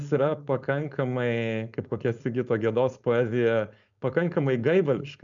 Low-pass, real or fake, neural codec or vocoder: 7.2 kHz; fake; codec, 16 kHz, 4.8 kbps, FACodec